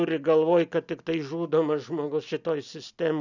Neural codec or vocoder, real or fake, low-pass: none; real; 7.2 kHz